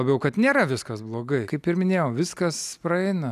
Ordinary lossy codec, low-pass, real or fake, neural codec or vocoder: AAC, 96 kbps; 14.4 kHz; real; none